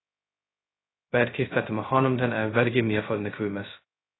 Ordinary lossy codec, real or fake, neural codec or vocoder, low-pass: AAC, 16 kbps; fake; codec, 16 kHz, 0.2 kbps, FocalCodec; 7.2 kHz